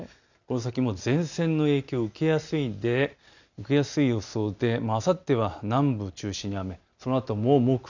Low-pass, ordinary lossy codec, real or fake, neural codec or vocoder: 7.2 kHz; none; real; none